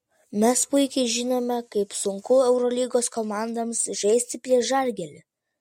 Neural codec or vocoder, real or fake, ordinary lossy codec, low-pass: none; real; MP3, 64 kbps; 19.8 kHz